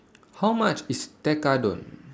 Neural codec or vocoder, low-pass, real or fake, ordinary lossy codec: none; none; real; none